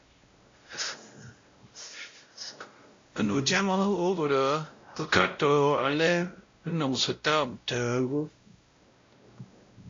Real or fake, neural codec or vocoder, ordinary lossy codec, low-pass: fake; codec, 16 kHz, 0.5 kbps, X-Codec, WavLM features, trained on Multilingual LibriSpeech; AAC, 48 kbps; 7.2 kHz